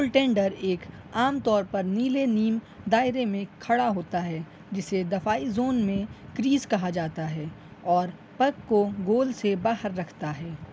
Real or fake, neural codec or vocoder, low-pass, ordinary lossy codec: real; none; none; none